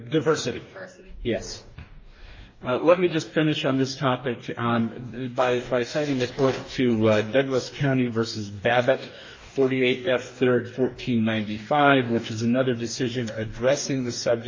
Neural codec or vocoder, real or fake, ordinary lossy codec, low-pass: codec, 44.1 kHz, 2.6 kbps, DAC; fake; MP3, 32 kbps; 7.2 kHz